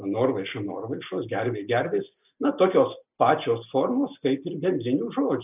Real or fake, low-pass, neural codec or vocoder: real; 3.6 kHz; none